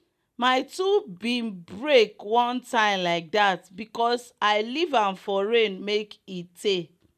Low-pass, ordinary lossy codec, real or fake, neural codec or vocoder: 14.4 kHz; none; real; none